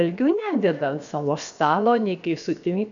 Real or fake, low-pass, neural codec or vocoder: fake; 7.2 kHz; codec, 16 kHz, about 1 kbps, DyCAST, with the encoder's durations